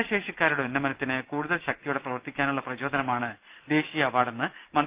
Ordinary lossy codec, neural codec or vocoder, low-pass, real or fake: Opus, 32 kbps; none; 3.6 kHz; real